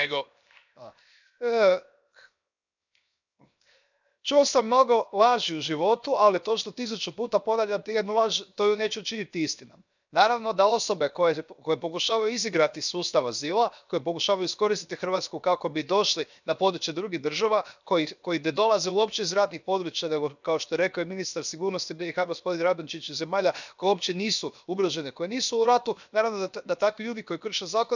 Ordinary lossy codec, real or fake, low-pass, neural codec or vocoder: none; fake; 7.2 kHz; codec, 16 kHz, 0.7 kbps, FocalCodec